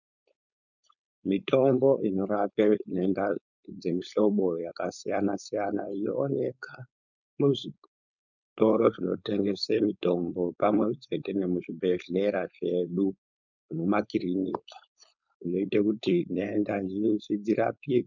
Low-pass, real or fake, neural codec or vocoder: 7.2 kHz; fake; codec, 16 kHz, 4.8 kbps, FACodec